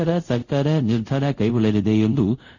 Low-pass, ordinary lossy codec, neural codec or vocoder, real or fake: 7.2 kHz; none; codec, 24 kHz, 0.5 kbps, DualCodec; fake